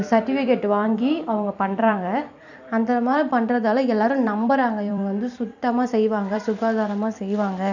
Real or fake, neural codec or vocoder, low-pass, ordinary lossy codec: fake; vocoder, 44.1 kHz, 128 mel bands every 256 samples, BigVGAN v2; 7.2 kHz; none